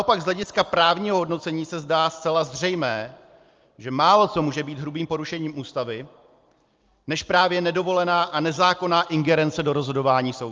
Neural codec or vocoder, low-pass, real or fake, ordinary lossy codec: none; 7.2 kHz; real; Opus, 24 kbps